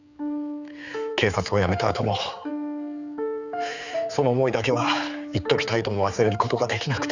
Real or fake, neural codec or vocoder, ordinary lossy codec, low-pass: fake; codec, 16 kHz, 4 kbps, X-Codec, HuBERT features, trained on general audio; Opus, 64 kbps; 7.2 kHz